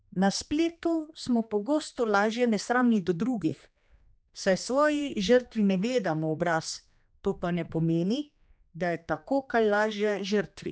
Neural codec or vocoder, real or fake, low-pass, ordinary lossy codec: codec, 16 kHz, 2 kbps, X-Codec, HuBERT features, trained on general audio; fake; none; none